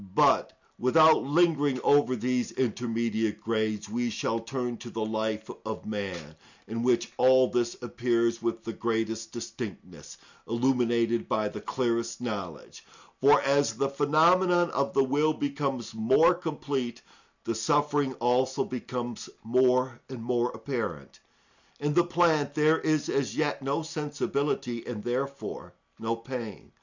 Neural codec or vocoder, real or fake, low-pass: none; real; 7.2 kHz